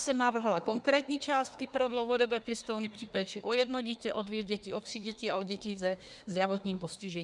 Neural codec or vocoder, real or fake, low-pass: codec, 24 kHz, 1 kbps, SNAC; fake; 10.8 kHz